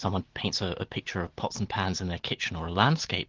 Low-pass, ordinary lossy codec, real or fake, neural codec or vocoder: 7.2 kHz; Opus, 16 kbps; real; none